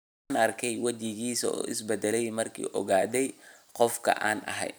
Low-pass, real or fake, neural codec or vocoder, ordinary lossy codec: none; real; none; none